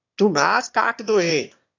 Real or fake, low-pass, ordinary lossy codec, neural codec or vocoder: fake; 7.2 kHz; AAC, 48 kbps; autoencoder, 22.05 kHz, a latent of 192 numbers a frame, VITS, trained on one speaker